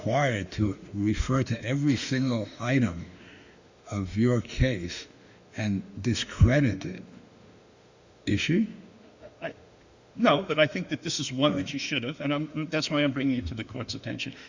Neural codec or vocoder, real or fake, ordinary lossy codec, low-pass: autoencoder, 48 kHz, 32 numbers a frame, DAC-VAE, trained on Japanese speech; fake; Opus, 64 kbps; 7.2 kHz